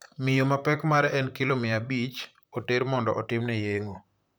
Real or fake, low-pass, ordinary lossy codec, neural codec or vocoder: fake; none; none; vocoder, 44.1 kHz, 128 mel bands, Pupu-Vocoder